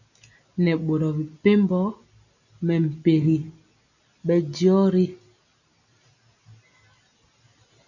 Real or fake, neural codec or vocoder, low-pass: real; none; 7.2 kHz